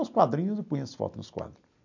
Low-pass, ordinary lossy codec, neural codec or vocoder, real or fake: 7.2 kHz; none; none; real